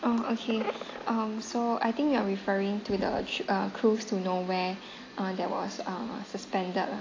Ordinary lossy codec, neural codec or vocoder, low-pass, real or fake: AAC, 32 kbps; none; 7.2 kHz; real